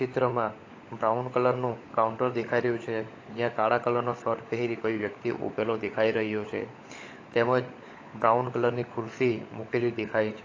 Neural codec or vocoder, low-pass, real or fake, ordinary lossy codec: codec, 16 kHz, 16 kbps, FunCodec, trained on LibriTTS, 50 frames a second; 7.2 kHz; fake; AAC, 32 kbps